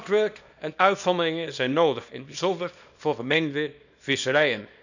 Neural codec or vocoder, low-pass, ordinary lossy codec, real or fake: codec, 24 kHz, 0.9 kbps, WavTokenizer, small release; 7.2 kHz; none; fake